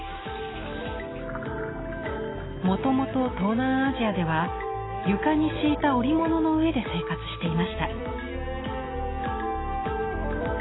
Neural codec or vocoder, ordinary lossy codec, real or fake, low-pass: none; AAC, 16 kbps; real; 7.2 kHz